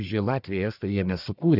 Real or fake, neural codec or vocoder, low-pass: fake; codec, 44.1 kHz, 1.7 kbps, Pupu-Codec; 5.4 kHz